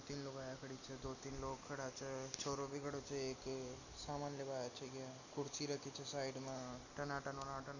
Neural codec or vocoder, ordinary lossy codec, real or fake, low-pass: none; none; real; 7.2 kHz